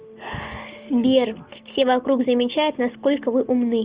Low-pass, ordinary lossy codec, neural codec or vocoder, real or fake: 3.6 kHz; Opus, 32 kbps; none; real